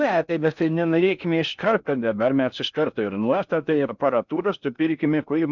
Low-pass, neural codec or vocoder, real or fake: 7.2 kHz; codec, 16 kHz in and 24 kHz out, 0.6 kbps, FocalCodec, streaming, 4096 codes; fake